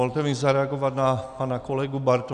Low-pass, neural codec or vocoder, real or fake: 10.8 kHz; none; real